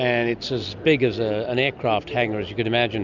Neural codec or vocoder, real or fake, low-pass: none; real; 7.2 kHz